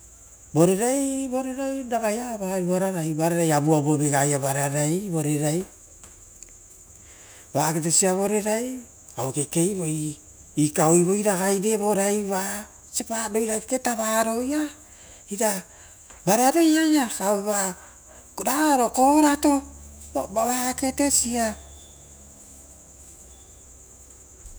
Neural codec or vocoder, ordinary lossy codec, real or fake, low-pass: autoencoder, 48 kHz, 128 numbers a frame, DAC-VAE, trained on Japanese speech; none; fake; none